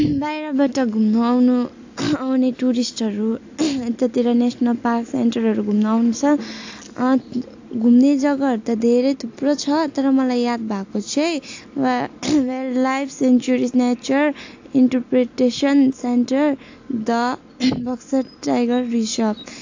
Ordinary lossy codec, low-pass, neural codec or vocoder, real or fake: AAC, 48 kbps; 7.2 kHz; none; real